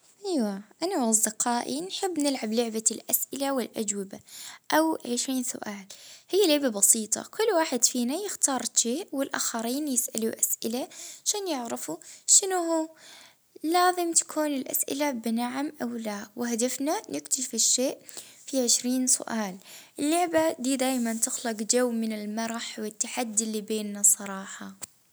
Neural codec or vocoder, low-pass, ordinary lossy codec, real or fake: none; none; none; real